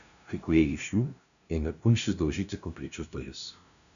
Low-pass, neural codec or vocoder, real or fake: 7.2 kHz; codec, 16 kHz, 0.5 kbps, FunCodec, trained on LibriTTS, 25 frames a second; fake